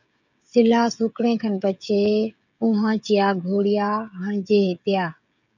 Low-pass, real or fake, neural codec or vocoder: 7.2 kHz; fake; codec, 16 kHz, 16 kbps, FreqCodec, smaller model